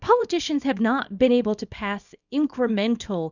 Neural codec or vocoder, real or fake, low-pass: codec, 24 kHz, 0.9 kbps, WavTokenizer, small release; fake; 7.2 kHz